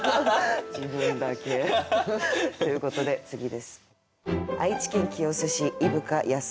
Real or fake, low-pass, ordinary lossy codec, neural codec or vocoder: real; none; none; none